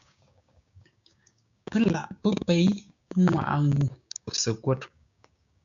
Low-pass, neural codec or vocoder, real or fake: 7.2 kHz; codec, 16 kHz, 6 kbps, DAC; fake